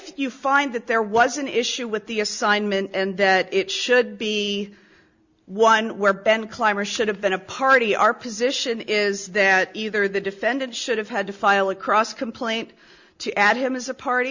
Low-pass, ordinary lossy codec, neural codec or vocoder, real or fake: 7.2 kHz; Opus, 64 kbps; none; real